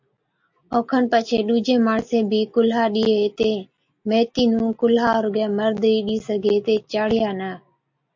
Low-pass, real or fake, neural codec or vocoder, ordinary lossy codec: 7.2 kHz; real; none; MP3, 48 kbps